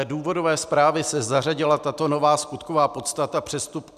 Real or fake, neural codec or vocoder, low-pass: real; none; 14.4 kHz